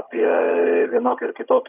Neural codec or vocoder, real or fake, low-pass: vocoder, 22.05 kHz, 80 mel bands, HiFi-GAN; fake; 3.6 kHz